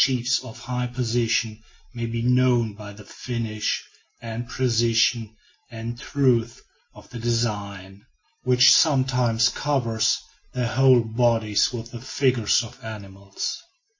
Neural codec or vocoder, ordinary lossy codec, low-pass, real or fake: none; MP3, 32 kbps; 7.2 kHz; real